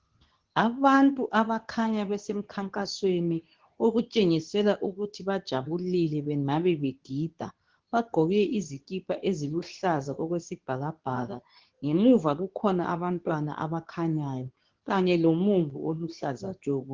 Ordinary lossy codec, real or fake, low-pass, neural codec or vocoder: Opus, 16 kbps; fake; 7.2 kHz; codec, 24 kHz, 0.9 kbps, WavTokenizer, medium speech release version 2